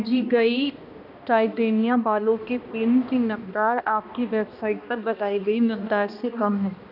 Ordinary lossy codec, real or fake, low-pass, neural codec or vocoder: none; fake; 5.4 kHz; codec, 16 kHz, 1 kbps, X-Codec, HuBERT features, trained on balanced general audio